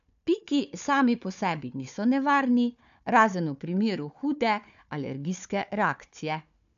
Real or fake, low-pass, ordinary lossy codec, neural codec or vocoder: fake; 7.2 kHz; none; codec, 16 kHz, 4 kbps, FunCodec, trained on Chinese and English, 50 frames a second